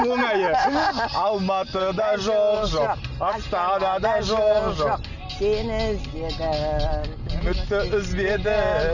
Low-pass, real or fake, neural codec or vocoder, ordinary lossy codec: 7.2 kHz; real; none; none